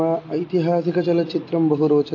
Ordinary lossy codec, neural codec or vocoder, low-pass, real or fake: AAC, 48 kbps; none; 7.2 kHz; real